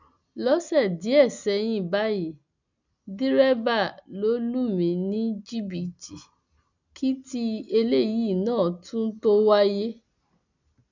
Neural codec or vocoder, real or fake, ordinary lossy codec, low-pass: none; real; none; 7.2 kHz